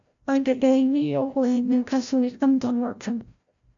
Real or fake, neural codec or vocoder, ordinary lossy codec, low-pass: fake; codec, 16 kHz, 0.5 kbps, FreqCodec, larger model; AAC, 64 kbps; 7.2 kHz